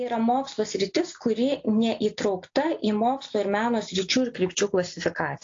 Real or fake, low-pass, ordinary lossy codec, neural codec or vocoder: real; 7.2 kHz; AAC, 48 kbps; none